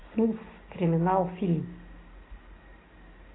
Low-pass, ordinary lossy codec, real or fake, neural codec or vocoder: 7.2 kHz; AAC, 16 kbps; real; none